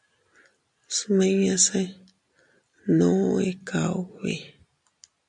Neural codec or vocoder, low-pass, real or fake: none; 10.8 kHz; real